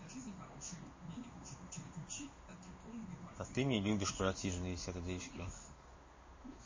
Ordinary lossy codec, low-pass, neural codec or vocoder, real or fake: MP3, 32 kbps; 7.2 kHz; codec, 16 kHz in and 24 kHz out, 1 kbps, XY-Tokenizer; fake